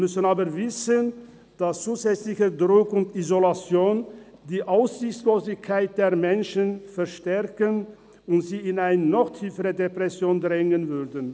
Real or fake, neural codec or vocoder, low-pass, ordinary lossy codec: real; none; none; none